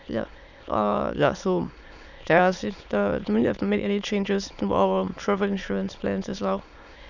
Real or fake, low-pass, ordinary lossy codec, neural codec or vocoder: fake; 7.2 kHz; none; autoencoder, 22.05 kHz, a latent of 192 numbers a frame, VITS, trained on many speakers